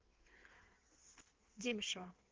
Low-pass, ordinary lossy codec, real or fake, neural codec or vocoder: 7.2 kHz; Opus, 16 kbps; fake; codec, 16 kHz in and 24 kHz out, 1.1 kbps, FireRedTTS-2 codec